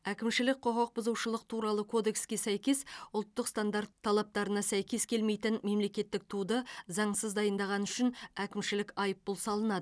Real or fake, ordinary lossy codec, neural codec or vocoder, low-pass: real; none; none; none